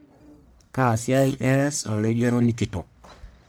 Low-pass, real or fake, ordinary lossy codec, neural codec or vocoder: none; fake; none; codec, 44.1 kHz, 1.7 kbps, Pupu-Codec